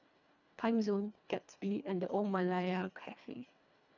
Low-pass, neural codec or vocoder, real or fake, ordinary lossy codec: 7.2 kHz; codec, 24 kHz, 1.5 kbps, HILCodec; fake; none